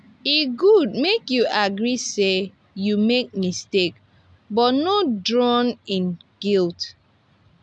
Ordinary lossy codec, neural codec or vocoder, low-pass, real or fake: none; none; 10.8 kHz; real